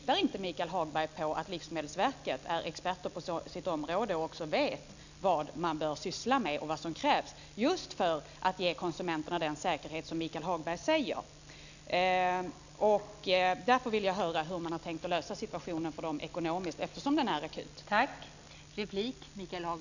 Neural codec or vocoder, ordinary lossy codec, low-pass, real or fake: none; none; 7.2 kHz; real